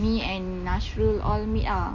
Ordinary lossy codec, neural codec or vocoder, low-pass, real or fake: none; none; 7.2 kHz; real